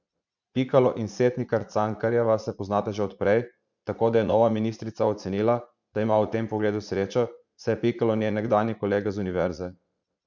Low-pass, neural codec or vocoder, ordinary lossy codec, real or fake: 7.2 kHz; vocoder, 44.1 kHz, 80 mel bands, Vocos; none; fake